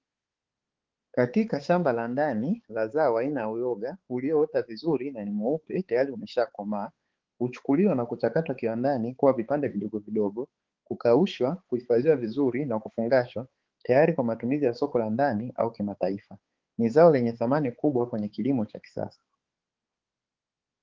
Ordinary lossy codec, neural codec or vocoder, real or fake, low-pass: Opus, 16 kbps; codec, 16 kHz, 4 kbps, X-Codec, HuBERT features, trained on balanced general audio; fake; 7.2 kHz